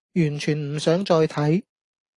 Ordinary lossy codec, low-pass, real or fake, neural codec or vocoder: AAC, 48 kbps; 10.8 kHz; real; none